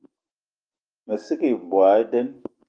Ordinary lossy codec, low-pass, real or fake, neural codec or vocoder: Opus, 24 kbps; 9.9 kHz; fake; autoencoder, 48 kHz, 128 numbers a frame, DAC-VAE, trained on Japanese speech